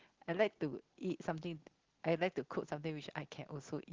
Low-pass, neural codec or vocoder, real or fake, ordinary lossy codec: 7.2 kHz; none; real; Opus, 16 kbps